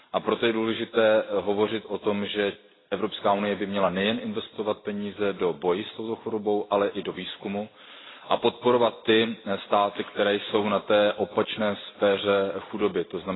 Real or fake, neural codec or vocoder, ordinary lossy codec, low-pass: real; none; AAC, 16 kbps; 7.2 kHz